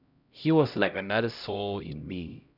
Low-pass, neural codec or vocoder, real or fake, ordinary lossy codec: 5.4 kHz; codec, 16 kHz, 0.5 kbps, X-Codec, HuBERT features, trained on LibriSpeech; fake; none